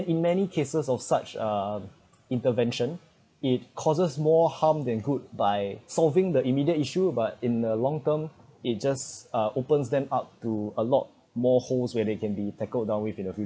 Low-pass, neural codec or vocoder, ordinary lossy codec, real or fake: none; none; none; real